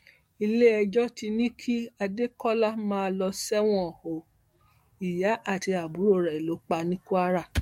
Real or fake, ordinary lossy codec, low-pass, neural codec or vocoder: fake; MP3, 64 kbps; 19.8 kHz; codec, 44.1 kHz, 7.8 kbps, DAC